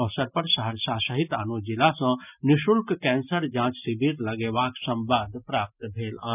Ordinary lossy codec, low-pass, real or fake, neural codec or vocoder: none; 3.6 kHz; real; none